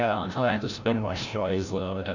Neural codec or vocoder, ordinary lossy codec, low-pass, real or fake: codec, 16 kHz, 0.5 kbps, FreqCodec, larger model; none; 7.2 kHz; fake